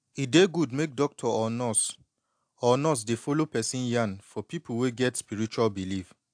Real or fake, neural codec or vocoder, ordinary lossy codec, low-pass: real; none; AAC, 64 kbps; 9.9 kHz